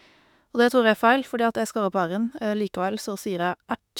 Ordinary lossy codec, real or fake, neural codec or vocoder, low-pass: none; fake; autoencoder, 48 kHz, 32 numbers a frame, DAC-VAE, trained on Japanese speech; 19.8 kHz